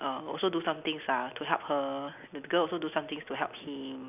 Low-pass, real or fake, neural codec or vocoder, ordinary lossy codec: 3.6 kHz; real; none; none